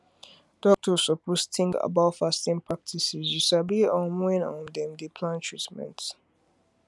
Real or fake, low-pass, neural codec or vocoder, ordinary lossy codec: real; none; none; none